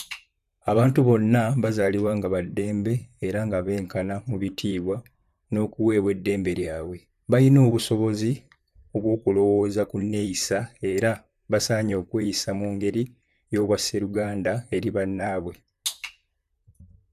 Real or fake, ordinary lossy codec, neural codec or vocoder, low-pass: fake; none; vocoder, 44.1 kHz, 128 mel bands, Pupu-Vocoder; 14.4 kHz